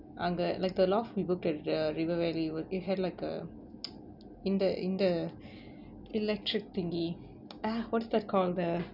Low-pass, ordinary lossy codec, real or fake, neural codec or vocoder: 5.4 kHz; none; real; none